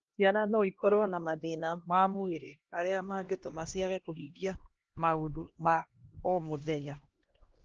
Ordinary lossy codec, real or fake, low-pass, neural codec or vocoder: Opus, 16 kbps; fake; 7.2 kHz; codec, 16 kHz, 1 kbps, X-Codec, HuBERT features, trained on LibriSpeech